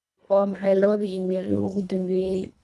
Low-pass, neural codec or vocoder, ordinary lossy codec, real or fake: none; codec, 24 kHz, 1.5 kbps, HILCodec; none; fake